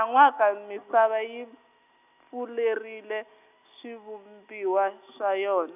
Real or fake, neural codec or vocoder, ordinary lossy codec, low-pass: real; none; none; 3.6 kHz